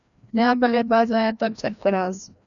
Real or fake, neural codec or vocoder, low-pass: fake; codec, 16 kHz, 1 kbps, FreqCodec, larger model; 7.2 kHz